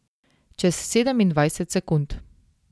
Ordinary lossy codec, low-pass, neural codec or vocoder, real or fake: none; none; none; real